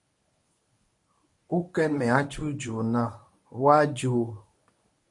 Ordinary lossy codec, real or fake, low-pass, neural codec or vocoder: MP3, 48 kbps; fake; 10.8 kHz; codec, 24 kHz, 0.9 kbps, WavTokenizer, medium speech release version 1